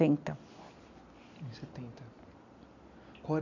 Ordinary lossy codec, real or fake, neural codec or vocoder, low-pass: none; real; none; 7.2 kHz